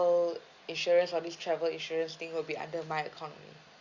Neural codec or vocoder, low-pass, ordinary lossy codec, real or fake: none; 7.2 kHz; Opus, 64 kbps; real